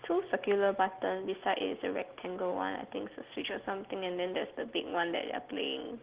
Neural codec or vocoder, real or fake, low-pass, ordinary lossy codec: none; real; 3.6 kHz; Opus, 16 kbps